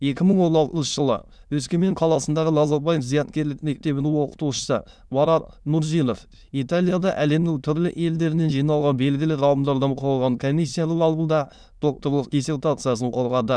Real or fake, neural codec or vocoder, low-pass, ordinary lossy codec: fake; autoencoder, 22.05 kHz, a latent of 192 numbers a frame, VITS, trained on many speakers; none; none